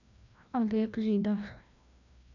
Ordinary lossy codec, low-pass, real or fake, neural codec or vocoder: none; 7.2 kHz; fake; codec, 16 kHz, 1 kbps, FreqCodec, larger model